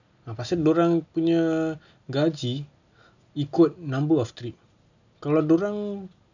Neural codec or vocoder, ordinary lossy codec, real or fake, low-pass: none; none; real; 7.2 kHz